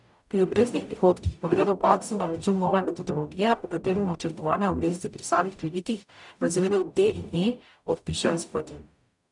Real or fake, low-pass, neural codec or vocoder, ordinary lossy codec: fake; 10.8 kHz; codec, 44.1 kHz, 0.9 kbps, DAC; none